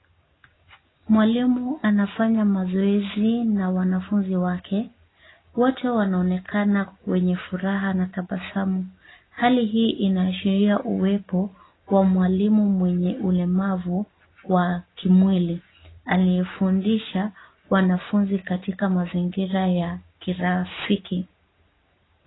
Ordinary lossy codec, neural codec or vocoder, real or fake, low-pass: AAC, 16 kbps; none; real; 7.2 kHz